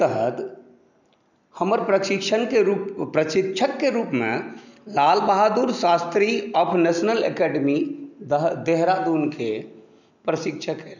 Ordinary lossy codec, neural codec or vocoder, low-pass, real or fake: none; none; 7.2 kHz; real